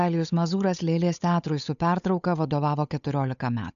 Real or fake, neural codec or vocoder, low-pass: fake; codec, 16 kHz, 8 kbps, FunCodec, trained on Chinese and English, 25 frames a second; 7.2 kHz